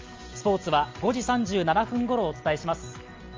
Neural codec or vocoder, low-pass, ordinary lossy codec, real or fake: none; 7.2 kHz; Opus, 32 kbps; real